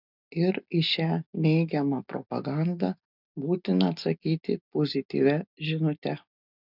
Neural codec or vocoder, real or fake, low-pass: none; real; 5.4 kHz